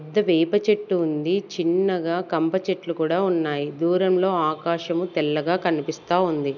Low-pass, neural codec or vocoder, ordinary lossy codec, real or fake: 7.2 kHz; none; none; real